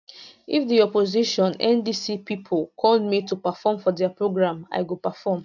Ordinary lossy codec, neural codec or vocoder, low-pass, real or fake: none; none; 7.2 kHz; real